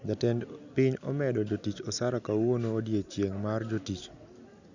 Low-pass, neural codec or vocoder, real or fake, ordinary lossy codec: 7.2 kHz; none; real; none